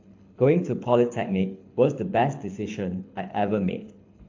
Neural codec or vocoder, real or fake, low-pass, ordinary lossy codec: codec, 24 kHz, 6 kbps, HILCodec; fake; 7.2 kHz; AAC, 48 kbps